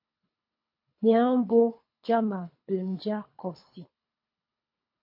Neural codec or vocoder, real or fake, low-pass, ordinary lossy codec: codec, 24 kHz, 3 kbps, HILCodec; fake; 5.4 kHz; MP3, 32 kbps